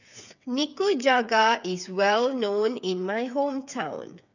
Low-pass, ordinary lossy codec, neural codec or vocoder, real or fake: 7.2 kHz; none; codec, 16 kHz, 8 kbps, FreqCodec, smaller model; fake